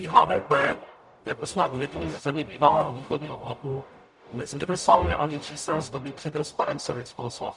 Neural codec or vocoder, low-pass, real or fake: codec, 44.1 kHz, 0.9 kbps, DAC; 10.8 kHz; fake